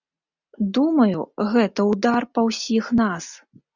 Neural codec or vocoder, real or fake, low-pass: none; real; 7.2 kHz